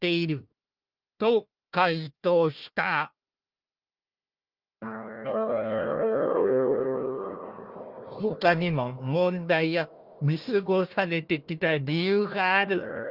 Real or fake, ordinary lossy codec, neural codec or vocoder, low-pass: fake; Opus, 24 kbps; codec, 16 kHz, 1 kbps, FunCodec, trained on Chinese and English, 50 frames a second; 5.4 kHz